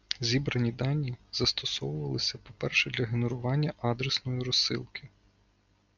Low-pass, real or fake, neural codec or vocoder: 7.2 kHz; real; none